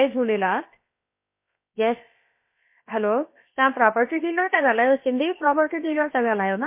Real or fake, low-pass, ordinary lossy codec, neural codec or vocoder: fake; 3.6 kHz; MP3, 24 kbps; codec, 16 kHz, about 1 kbps, DyCAST, with the encoder's durations